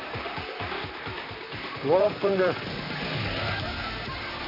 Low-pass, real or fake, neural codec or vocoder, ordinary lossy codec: 5.4 kHz; fake; vocoder, 44.1 kHz, 128 mel bands, Pupu-Vocoder; none